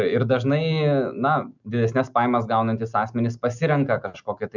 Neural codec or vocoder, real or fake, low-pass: none; real; 7.2 kHz